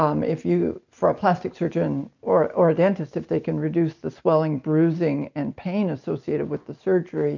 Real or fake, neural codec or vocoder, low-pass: real; none; 7.2 kHz